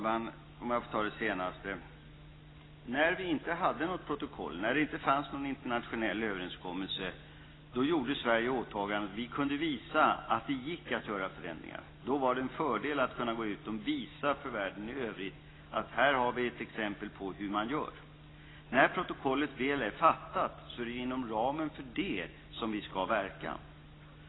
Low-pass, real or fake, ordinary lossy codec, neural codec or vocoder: 7.2 kHz; real; AAC, 16 kbps; none